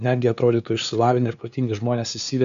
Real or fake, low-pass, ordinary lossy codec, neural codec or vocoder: fake; 7.2 kHz; AAC, 96 kbps; codec, 16 kHz, 2 kbps, FunCodec, trained on LibriTTS, 25 frames a second